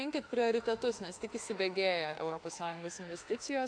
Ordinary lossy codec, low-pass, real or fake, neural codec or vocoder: AAC, 48 kbps; 9.9 kHz; fake; autoencoder, 48 kHz, 32 numbers a frame, DAC-VAE, trained on Japanese speech